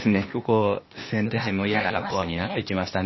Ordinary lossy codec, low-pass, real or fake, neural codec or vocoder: MP3, 24 kbps; 7.2 kHz; fake; codec, 16 kHz, 0.8 kbps, ZipCodec